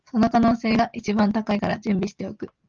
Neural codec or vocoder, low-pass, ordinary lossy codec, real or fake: none; 7.2 kHz; Opus, 24 kbps; real